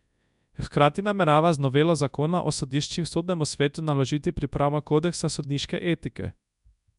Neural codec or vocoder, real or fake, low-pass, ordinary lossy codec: codec, 24 kHz, 0.9 kbps, WavTokenizer, large speech release; fake; 10.8 kHz; none